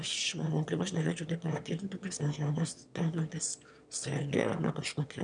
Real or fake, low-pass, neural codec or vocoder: fake; 9.9 kHz; autoencoder, 22.05 kHz, a latent of 192 numbers a frame, VITS, trained on one speaker